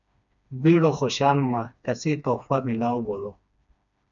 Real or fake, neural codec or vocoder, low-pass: fake; codec, 16 kHz, 2 kbps, FreqCodec, smaller model; 7.2 kHz